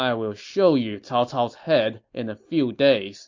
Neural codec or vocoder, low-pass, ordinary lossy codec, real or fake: none; 7.2 kHz; MP3, 48 kbps; real